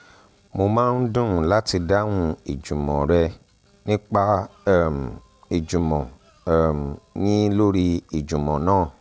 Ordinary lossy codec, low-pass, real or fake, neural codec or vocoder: none; none; real; none